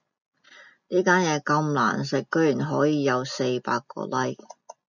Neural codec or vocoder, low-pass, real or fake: none; 7.2 kHz; real